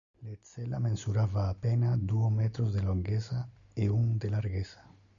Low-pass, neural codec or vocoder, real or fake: 7.2 kHz; none; real